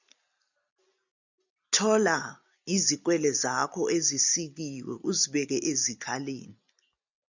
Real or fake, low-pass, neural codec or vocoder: real; 7.2 kHz; none